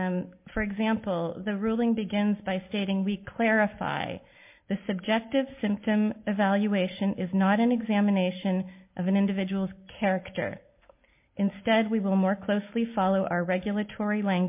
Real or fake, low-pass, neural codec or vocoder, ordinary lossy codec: real; 3.6 kHz; none; MP3, 32 kbps